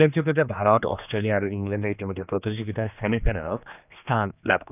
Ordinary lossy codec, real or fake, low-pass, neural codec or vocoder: none; fake; 3.6 kHz; codec, 16 kHz, 2 kbps, X-Codec, HuBERT features, trained on general audio